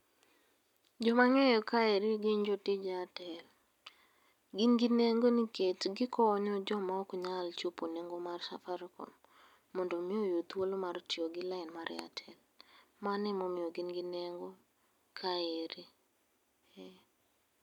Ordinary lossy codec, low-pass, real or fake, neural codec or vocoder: none; 19.8 kHz; real; none